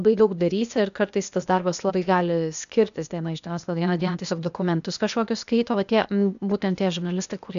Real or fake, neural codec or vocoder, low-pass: fake; codec, 16 kHz, 0.8 kbps, ZipCodec; 7.2 kHz